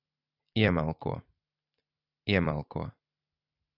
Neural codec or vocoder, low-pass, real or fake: vocoder, 44.1 kHz, 128 mel bands every 256 samples, BigVGAN v2; 5.4 kHz; fake